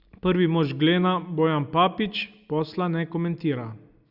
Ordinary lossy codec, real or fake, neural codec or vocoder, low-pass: Opus, 64 kbps; real; none; 5.4 kHz